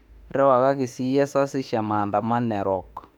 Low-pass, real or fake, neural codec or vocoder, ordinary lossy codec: 19.8 kHz; fake; autoencoder, 48 kHz, 32 numbers a frame, DAC-VAE, trained on Japanese speech; none